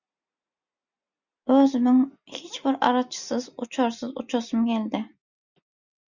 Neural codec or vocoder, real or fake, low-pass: none; real; 7.2 kHz